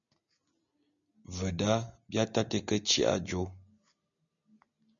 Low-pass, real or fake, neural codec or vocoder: 7.2 kHz; real; none